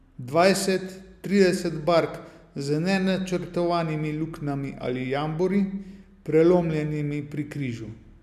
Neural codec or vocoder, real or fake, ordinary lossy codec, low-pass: none; real; MP3, 96 kbps; 14.4 kHz